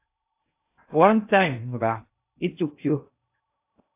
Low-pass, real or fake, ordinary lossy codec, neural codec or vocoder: 3.6 kHz; fake; AAC, 32 kbps; codec, 16 kHz in and 24 kHz out, 0.6 kbps, FocalCodec, streaming, 2048 codes